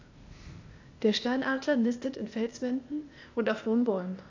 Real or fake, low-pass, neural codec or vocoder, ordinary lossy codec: fake; 7.2 kHz; codec, 16 kHz, 0.8 kbps, ZipCodec; AAC, 48 kbps